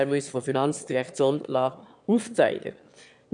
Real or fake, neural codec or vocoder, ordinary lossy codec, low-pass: fake; autoencoder, 22.05 kHz, a latent of 192 numbers a frame, VITS, trained on one speaker; none; 9.9 kHz